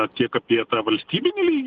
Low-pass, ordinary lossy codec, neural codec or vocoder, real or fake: 7.2 kHz; Opus, 16 kbps; none; real